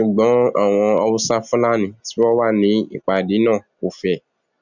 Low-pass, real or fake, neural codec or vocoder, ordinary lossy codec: 7.2 kHz; real; none; none